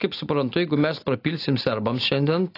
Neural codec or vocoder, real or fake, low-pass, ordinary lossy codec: none; real; 5.4 kHz; AAC, 32 kbps